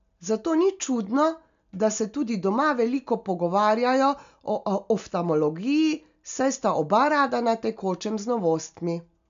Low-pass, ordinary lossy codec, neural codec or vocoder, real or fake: 7.2 kHz; MP3, 64 kbps; none; real